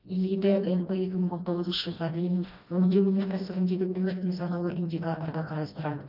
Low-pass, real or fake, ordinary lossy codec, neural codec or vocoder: 5.4 kHz; fake; none; codec, 16 kHz, 1 kbps, FreqCodec, smaller model